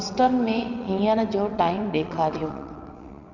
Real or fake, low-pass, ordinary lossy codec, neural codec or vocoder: fake; 7.2 kHz; none; vocoder, 22.05 kHz, 80 mel bands, WaveNeXt